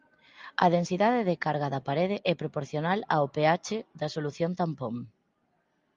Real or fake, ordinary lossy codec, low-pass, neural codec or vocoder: real; Opus, 32 kbps; 7.2 kHz; none